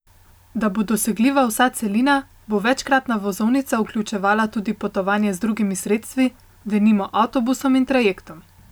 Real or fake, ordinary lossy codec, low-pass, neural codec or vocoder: real; none; none; none